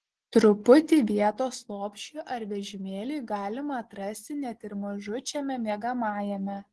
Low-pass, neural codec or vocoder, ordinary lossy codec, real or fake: 10.8 kHz; none; Opus, 16 kbps; real